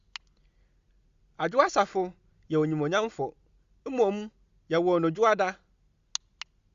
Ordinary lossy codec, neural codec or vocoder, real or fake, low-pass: Opus, 64 kbps; none; real; 7.2 kHz